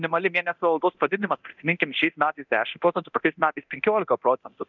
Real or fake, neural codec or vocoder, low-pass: fake; codec, 24 kHz, 0.9 kbps, DualCodec; 7.2 kHz